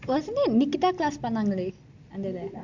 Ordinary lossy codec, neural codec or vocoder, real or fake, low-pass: MP3, 64 kbps; none; real; 7.2 kHz